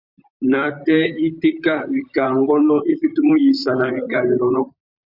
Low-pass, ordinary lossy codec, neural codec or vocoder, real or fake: 5.4 kHz; Opus, 64 kbps; vocoder, 44.1 kHz, 128 mel bands, Pupu-Vocoder; fake